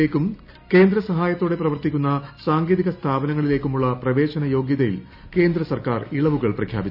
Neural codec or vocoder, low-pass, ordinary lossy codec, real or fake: none; 5.4 kHz; none; real